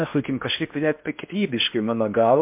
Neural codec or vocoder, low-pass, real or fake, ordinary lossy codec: codec, 16 kHz in and 24 kHz out, 0.6 kbps, FocalCodec, streaming, 4096 codes; 3.6 kHz; fake; MP3, 32 kbps